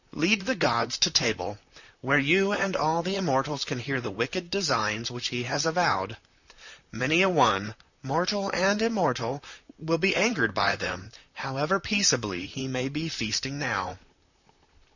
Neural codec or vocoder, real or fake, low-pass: vocoder, 44.1 kHz, 128 mel bands, Pupu-Vocoder; fake; 7.2 kHz